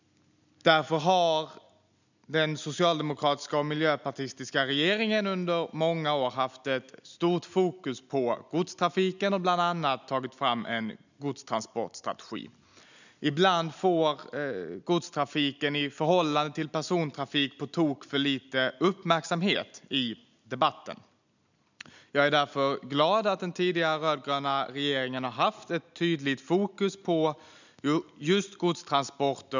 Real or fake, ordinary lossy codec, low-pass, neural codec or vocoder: real; none; 7.2 kHz; none